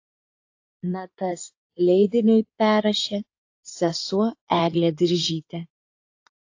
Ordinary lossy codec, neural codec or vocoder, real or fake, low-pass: AAC, 48 kbps; codec, 16 kHz in and 24 kHz out, 2.2 kbps, FireRedTTS-2 codec; fake; 7.2 kHz